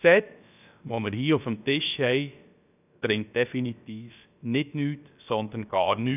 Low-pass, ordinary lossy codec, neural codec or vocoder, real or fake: 3.6 kHz; none; codec, 16 kHz, about 1 kbps, DyCAST, with the encoder's durations; fake